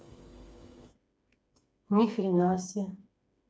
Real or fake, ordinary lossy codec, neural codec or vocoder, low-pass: fake; none; codec, 16 kHz, 4 kbps, FreqCodec, smaller model; none